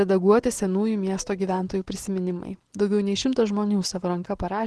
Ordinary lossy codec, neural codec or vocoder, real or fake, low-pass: Opus, 16 kbps; none; real; 10.8 kHz